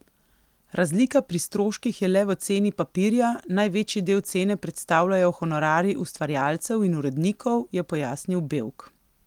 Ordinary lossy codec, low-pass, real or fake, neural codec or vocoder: Opus, 24 kbps; 19.8 kHz; real; none